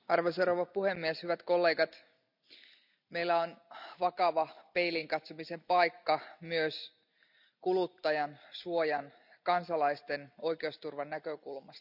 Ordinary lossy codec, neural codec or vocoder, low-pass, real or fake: none; none; 5.4 kHz; real